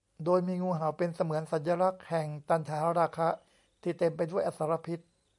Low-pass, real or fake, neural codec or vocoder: 10.8 kHz; real; none